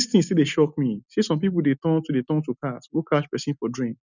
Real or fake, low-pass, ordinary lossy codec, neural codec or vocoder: real; 7.2 kHz; none; none